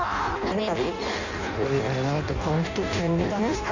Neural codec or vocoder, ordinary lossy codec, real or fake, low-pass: codec, 16 kHz in and 24 kHz out, 0.6 kbps, FireRedTTS-2 codec; none; fake; 7.2 kHz